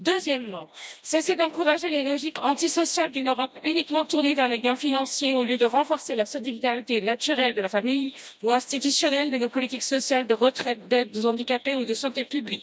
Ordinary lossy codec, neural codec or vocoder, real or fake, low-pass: none; codec, 16 kHz, 1 kbps, FreqCodec, smaller model; fake; none